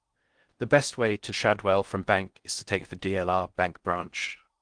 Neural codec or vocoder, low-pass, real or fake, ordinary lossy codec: codec, 16 kHz in and 24 kHz out, 0.6 kbps, FocalCodec, streaming, 4096 codes; 9.9 kHz; fake; Opus, 24 kbps